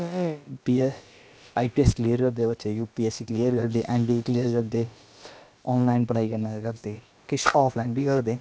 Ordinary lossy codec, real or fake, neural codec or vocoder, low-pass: none; fake; codec, 16 kHz, about 1 kbps, DyCAST, with the encoder's durations; none